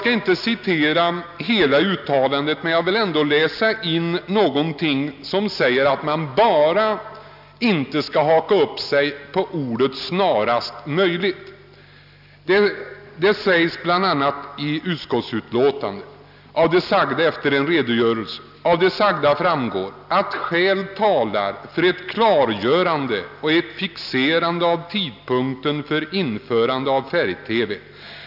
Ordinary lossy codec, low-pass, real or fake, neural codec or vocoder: none; 5.4 kHz; real; none